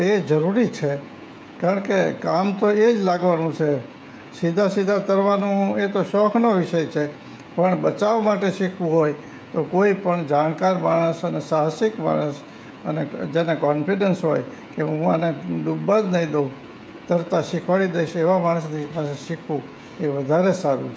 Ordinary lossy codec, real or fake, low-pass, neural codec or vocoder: none; fake; none; codec, 16 kHz, 16 kbps, FreqCodec, smaller model